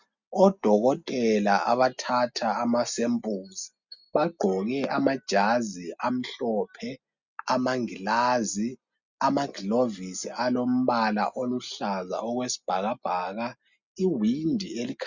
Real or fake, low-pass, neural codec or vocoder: real; 7.2 kHz; none